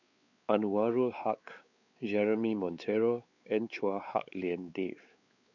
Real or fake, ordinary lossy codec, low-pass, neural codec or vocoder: fake; none; 7.2 kHz; codec, 16 kHz, 4 kbps, X-Codec, WavLM features, trained on Multilingual LibriSpeech